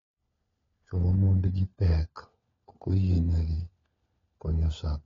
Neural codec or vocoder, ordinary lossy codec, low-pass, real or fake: codec, 16 kHz, 4 kbps, FunCodec, trained on LibriTTS, 50 frames a second; AAC, 32 kbps; 7.2 kHz; fake